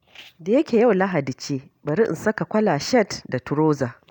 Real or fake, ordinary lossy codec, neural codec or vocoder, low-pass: real; none; none; 19.8 kHz